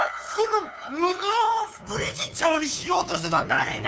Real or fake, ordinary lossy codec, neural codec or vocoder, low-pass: fake; none; codec, 16 kHz, 2 kbps, FunCodec, trained on LibriTTS, 25 frames a second; none